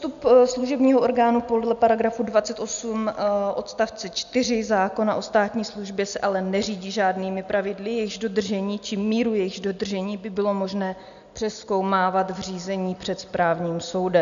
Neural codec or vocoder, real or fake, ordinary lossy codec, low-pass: none; real; AAC, 96 kbps; 7.2 kHz